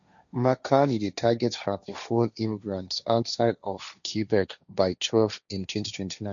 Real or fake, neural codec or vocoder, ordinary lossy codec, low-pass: fake; codec, 16 kHz, 1.1 kbps, Voila-Tokenizer; none; 7.2 kHz